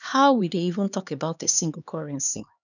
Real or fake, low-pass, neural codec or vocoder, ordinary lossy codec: fake; 7.2 kHz; codec, 16 kHz, 2 kbps, X-Codec, HuBERT features, trained on LibriSpeech; none